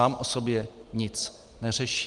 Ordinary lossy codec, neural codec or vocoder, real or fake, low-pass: Opus, 24 kbps; none; real; 10.8 kHz